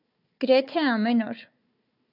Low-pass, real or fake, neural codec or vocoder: 5.4 kHz; fake; codec, 16 kHz, 4 kbps, FunCodec, trained on Chinese and English, 50 frames a second